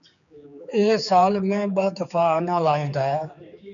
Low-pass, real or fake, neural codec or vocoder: 7.2 kHz; fake; codec, 16 kHz, 4 kbps, X-Codec, HuBERT features, trained on general audio